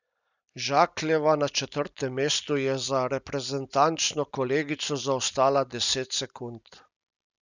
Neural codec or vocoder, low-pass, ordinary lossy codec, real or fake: none; 7.2 kHz; none; real